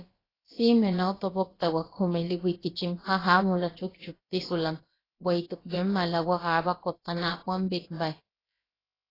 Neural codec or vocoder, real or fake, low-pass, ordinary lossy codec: codec, 16 kHz, about 1 kbps, DyCAST, with the encoder's durations; fake; 5.4 kHz; AAC, 24 kbps